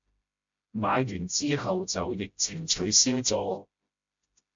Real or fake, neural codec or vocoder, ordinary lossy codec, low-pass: fake; codec, 16 kHz, 0.5 kbps, FreqCodec, smaller model; MP3, 48 kbps; 7.2 kHz